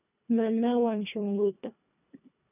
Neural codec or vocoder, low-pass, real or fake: codec, 24 kHz, 1.5 kbps, HILCodec; 3.6 kHz; fake